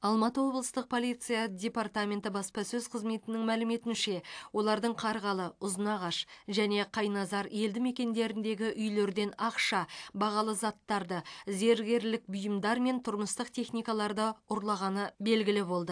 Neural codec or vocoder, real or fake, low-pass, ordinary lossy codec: none; real; 9.9 kHz; AAC, 64 kbps